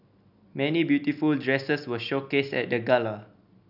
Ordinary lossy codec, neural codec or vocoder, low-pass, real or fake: none; none; 5.4 kHz; real